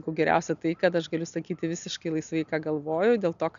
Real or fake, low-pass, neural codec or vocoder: real; 7.2 kHz; none